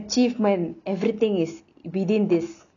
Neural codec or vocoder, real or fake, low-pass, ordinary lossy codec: none; real; 7.2 kHz; none